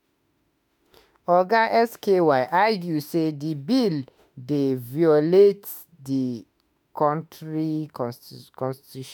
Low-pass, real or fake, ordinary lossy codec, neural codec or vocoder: none; fake; none; autoencoder, 48 kHz, 32 numbers a frame, DAC-VAE, trained on Japanese speech